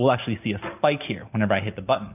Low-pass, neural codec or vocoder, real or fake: 3.6 kHz; none; real